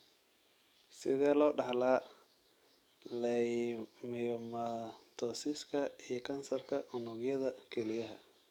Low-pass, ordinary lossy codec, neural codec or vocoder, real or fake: 19.8 kHz; none; codec, 44.1 kHz, 7.8 kbps, DAC; fake